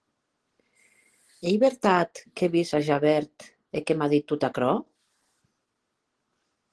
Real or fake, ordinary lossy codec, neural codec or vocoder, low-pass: real; Opus, 16 kbps; none; 10.8 kHz